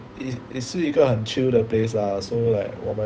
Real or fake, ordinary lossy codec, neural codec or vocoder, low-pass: fake; none; codec, 16 kHz, 8 kbps, FunCodec, trained on Chinese and English, 25 frames a second; none